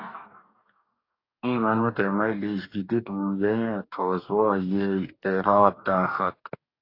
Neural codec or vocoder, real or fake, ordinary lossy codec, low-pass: codec, 44.1 kHz, 2.6 kbps, DAC; fake; AAC, 32 kbps; 5.4 kHz